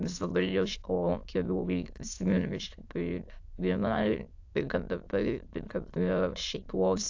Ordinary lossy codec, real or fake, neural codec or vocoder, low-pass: AAC, 48 kbps; fake; autoencoder, 22.05 kHz, a latent of 192 numbers a frame, VITS, trained on many speakers; 7.2 kHz